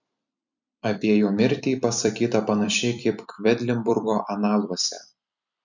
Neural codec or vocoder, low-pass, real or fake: vocoder, 44.1 kHz, 128 mel bands every 256 samples, BigVGAN v2; 7.2 kHz; fake